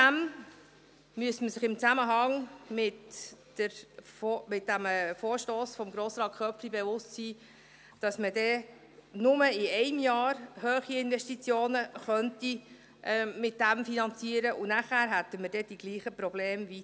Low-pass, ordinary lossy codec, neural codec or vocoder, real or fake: none; none; none; real